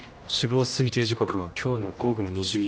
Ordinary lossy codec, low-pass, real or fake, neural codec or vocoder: none; none; fake; codec, 16 kHz, 1 kbps, X-Codec, HuBERT features, trained on general audio